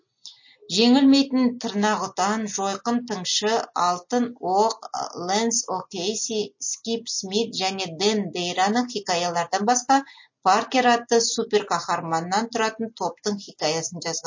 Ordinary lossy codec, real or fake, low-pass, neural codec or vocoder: MP3, 32 kbps; real; 7.2 kHz; none